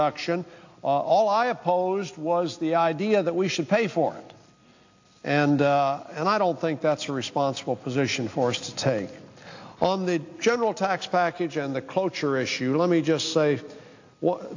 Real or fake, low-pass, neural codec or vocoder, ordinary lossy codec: real; 7.2 kHz; none; AAC, 48 kbps